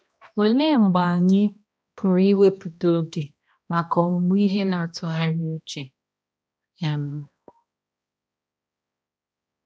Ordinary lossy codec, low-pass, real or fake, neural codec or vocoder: none; none; fake; codec, 16 kHz, 1 kbps, X-Codec, HuBERT features, trained on balanced general audio